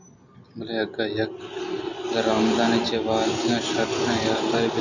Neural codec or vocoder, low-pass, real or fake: none; 7.2 kHz; real